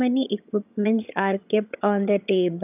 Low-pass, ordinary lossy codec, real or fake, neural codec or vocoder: 3.6 kHz; none; fake; vocoder, 22.05 kHz, 80 mel bands, HiFi-GAN